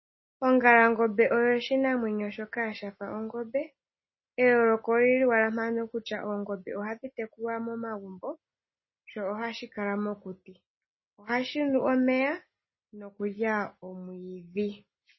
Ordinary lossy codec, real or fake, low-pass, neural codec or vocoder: MP3, 24 kbps; real; 7.2 kHz; none